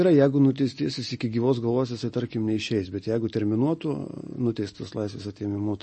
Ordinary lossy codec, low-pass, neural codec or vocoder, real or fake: MP3, 32 kbps; 10.8 kHz; none; real